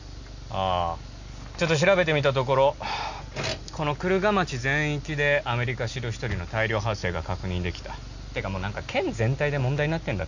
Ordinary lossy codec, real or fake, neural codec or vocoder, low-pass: none; real; none; 7.2 kHz